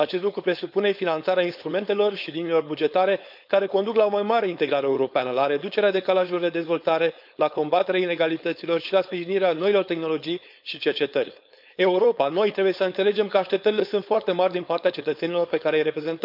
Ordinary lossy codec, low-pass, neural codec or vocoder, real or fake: none; 5.4 kHz; codec, 16 kHz, 4.8 kbps, FACodec; fake